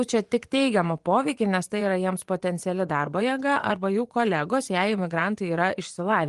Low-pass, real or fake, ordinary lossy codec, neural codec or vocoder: 10.8 kHz; fake; Opus, 24 kbps; vocoder, 24 kHz, 100 mel bands, Vocos